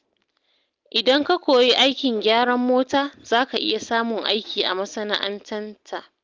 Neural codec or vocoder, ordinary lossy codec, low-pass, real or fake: none; Opus, 32 kbps; 7.2 kHz; real